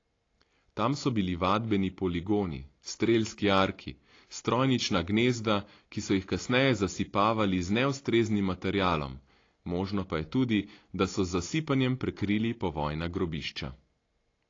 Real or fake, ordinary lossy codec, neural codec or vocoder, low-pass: real; AAC, 32 kbps; none; 7.2 kHz